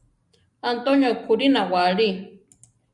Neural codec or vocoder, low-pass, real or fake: none; 10.8 kHz; real